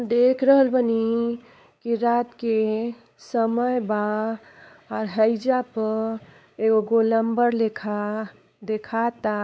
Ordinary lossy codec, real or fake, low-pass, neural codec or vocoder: none; fake; none; codec, 16 kHz, 4 kbps, X-Codec, WavLM features, trained on Multilingual LibriSpeech